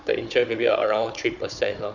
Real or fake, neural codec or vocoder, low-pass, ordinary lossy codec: fake; codec, 16 kHz, 16 kbps, FunCodec, trained on Chinese and English, 50 frames a second; 7.2 kHz; none